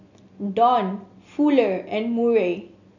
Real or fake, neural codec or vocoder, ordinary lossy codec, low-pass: real; none; none; 7.2 kHz